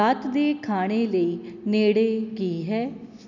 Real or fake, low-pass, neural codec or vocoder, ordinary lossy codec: real; 7.2 kHz; none; none